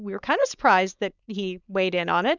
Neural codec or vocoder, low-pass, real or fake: codec, 16 kHz, 4.8 kbps, FACodec; 7.2 kHz; fake